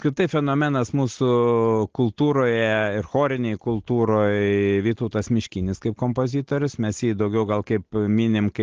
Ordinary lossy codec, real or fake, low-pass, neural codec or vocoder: Opus, 16 kbps; real; 7.2 kHz; none